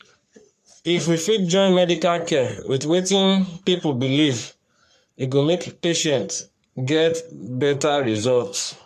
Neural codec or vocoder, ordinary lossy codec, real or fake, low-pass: codec, 44.1 kHz, 3.4 kbps, Pupu-Codec; MP3, 96 kbps; fake; 14.4 kHz